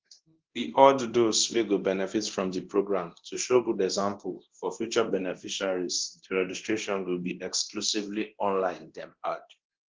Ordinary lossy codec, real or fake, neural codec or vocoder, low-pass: Opus, 16 kbps; fake; codec, 24 kHz, 0.9 kbps, DualCodec; 7.2 kHz